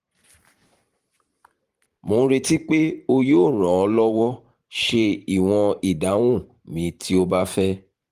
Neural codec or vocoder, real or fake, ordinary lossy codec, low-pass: vocoder, 44.1 kHz, 128 mel bands every 512 samples, BigVGAN v2; fake; Opus, 24 kbps; 14.4 kHz